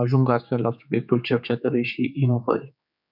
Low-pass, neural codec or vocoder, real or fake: 5.4 kHz; codec, 16 kHz, 4 kbps, X-Codec, HuBERT features, trained on general audio; fake